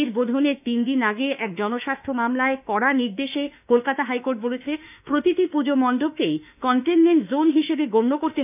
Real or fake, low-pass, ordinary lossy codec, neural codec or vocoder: fake; 3.6 kHz; none; autoencoder, 48 kHz, 32 numbers a frame, DAC-VAE, trained on Japanese speech